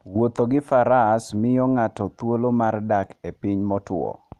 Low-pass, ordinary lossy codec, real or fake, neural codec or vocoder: 14.4 kHz; Opus, 32 kbps; real; none